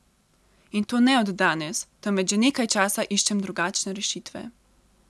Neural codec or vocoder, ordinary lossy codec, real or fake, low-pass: none; none; real; none